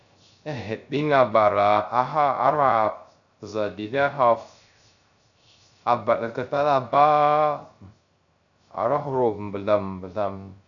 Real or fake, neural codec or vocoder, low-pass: fake; codec, 16 kHz, 0.3 kbps, FocalCodec; 7.2 kHz